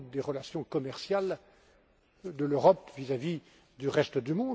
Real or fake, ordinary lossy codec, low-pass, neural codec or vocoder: real; none; none; none